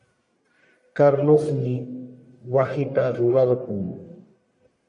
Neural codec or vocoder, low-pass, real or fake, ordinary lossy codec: codec, 44.1 kHz, 1.7 kbps, Pupu-Codec; 10.8 kHz; fake; MP3, 64 kbps